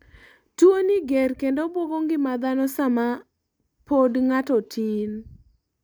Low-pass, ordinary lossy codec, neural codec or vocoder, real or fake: none; none; none; real